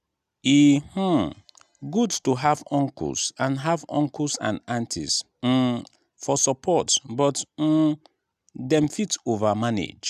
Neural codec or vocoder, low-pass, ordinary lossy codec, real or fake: none; 14.4 kHz; none; real